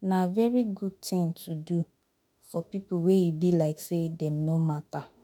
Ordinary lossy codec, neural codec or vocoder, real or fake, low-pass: none; autoencoder, 48 kHz, 32 numbers a frame, DAC-VAE, trained on Japanese speech; fake; 19.8 kHz